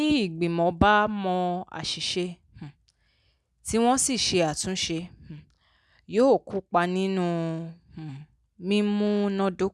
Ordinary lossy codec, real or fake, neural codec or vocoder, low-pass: none; real; none; none